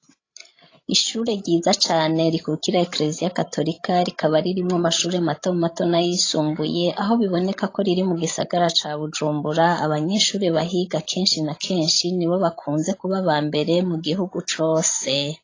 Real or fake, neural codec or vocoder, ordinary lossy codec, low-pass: fake; codec, 16 kHz, 16 kbps, FreqCodec, larger model; AAC, 32 kbps; 7.2 kHz